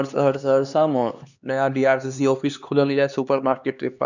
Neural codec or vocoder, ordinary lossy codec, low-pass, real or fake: codec, 16 kHz, 2 kbps, X-Codec, HuBERT features, trained on LibriSpeech; none; 7.2 kHz; fake